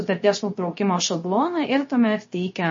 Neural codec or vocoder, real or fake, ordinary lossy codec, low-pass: codec, 16 kHz, 0.3 kbps, FocalCodec; fake; MP3, 32 kbps; 7.2 kHz